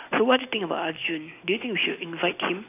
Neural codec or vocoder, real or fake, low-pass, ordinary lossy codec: none; real; 3.6 kHz; AAC, 24 kbps